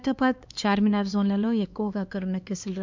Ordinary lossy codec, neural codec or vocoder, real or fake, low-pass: MP3, 64 kbps; codec, 16 kHz, 2 kbps, X-Codec, HuBERT features, trained on LibriSpeech; fake; 7.2 kHz